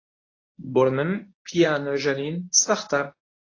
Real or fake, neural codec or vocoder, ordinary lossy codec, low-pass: fake; codec, 24 kHz, 0.9 kbps, WavTokenizer, medium speech release version 1; AAC, 32 kbps; 7.2 kHz